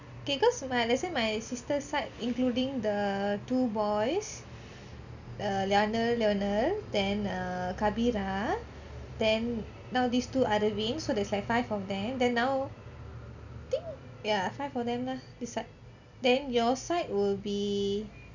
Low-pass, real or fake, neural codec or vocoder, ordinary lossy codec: 7.2 kHz; real; none; none